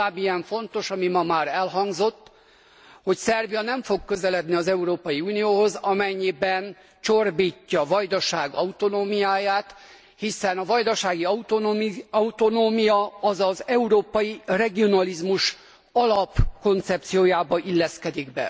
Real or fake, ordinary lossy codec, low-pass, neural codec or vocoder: real; none; none; none